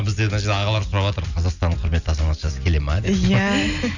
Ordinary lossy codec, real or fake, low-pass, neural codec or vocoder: none; real; 7.2 kHz; none